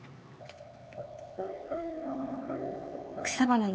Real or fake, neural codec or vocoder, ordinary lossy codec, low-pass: fake; codec, 16 kHz, 4 kbps, X-Codec, HuBERT features, trained on LibriSpeech; none; none